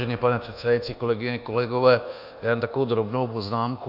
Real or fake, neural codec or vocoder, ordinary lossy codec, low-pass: fake; codec, 24 kHz, 1.2 kbps, DualCodec; AAC, 48 kbps; 5.4 kHz